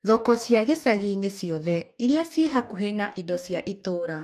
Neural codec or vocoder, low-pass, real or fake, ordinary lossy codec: codec, 44.1 kHz, 2.6 kbps, DAC; 14.4 kHz; fake; none